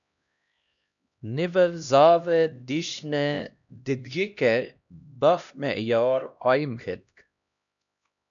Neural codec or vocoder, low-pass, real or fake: codec, 16 kHz, 1 kbps, X-Codec, HuBERT features, trained on LibriSpeech; 7.2 kHz; fake